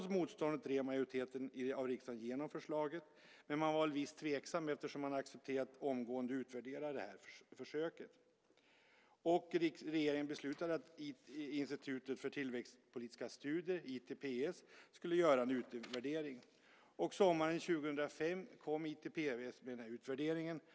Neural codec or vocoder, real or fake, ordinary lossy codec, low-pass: none; real; none; none